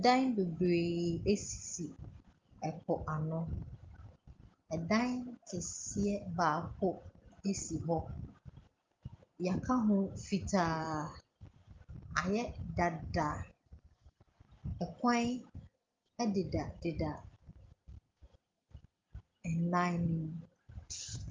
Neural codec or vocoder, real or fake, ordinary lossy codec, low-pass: none; real; Opus, 32 kbps; 7.2 kHz